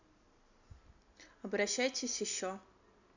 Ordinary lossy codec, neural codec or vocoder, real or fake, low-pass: none; none; real; 7.2 kHz